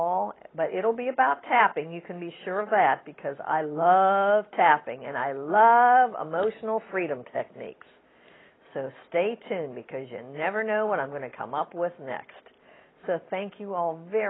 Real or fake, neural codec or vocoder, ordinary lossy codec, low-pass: real; none; AAC, 16 kbps; 7.2 kHz